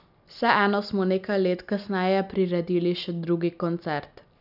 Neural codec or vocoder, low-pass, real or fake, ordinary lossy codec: none; 5.4 kHz; real; none